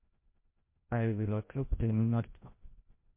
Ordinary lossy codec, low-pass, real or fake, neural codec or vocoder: MP3, 24 kbps; 3.6 kHz; fake; codec, 16 kHz, 0.5 kbps, FreqCodec, larger model